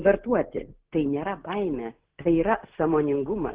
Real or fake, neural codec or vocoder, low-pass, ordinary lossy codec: real; none; 3.6 kHz; Opus, 16 kbps